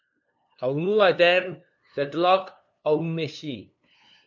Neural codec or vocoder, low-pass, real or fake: codec, 16 kHz, 2 kbps, FunCodec, trained on LibriTTS, 25 frames a second; 7.2 kHz; fake